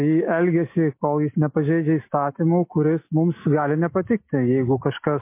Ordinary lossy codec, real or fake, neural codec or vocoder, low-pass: MP3, 24 kbps; real; none; 3.6 kHz